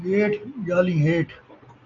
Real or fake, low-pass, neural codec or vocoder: real; 7.2 kHz; none